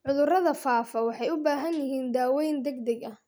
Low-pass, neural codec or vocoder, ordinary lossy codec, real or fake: none; none; none; real